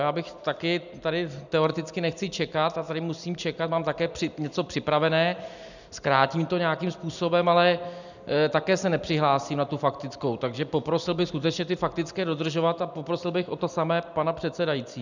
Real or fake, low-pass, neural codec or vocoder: real; 7.2 kHz; none